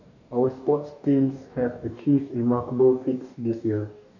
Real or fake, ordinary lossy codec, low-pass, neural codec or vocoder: fake; none; 7.2 kHz; codec, 44.1 kHz, 2.6 kbps, DAC